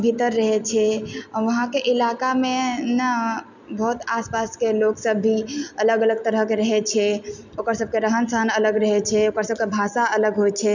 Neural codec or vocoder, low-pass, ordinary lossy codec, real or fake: none; 7.2 kHz; none; real